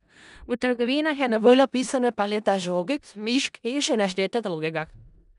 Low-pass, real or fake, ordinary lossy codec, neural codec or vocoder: 10.8 kHz; fake; none; codec, 16 kHz in and 24 kHz out, 0.4 kbps, LongCat-Audio-Codec, four codebook decoder